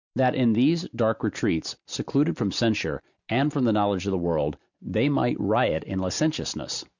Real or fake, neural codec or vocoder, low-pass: real; none; 7.2 kHz